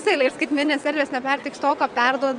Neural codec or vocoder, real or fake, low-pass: vocoder, 22.05 kHz, 80 mel bands, WaveNeXt; fake; 9.9 kHz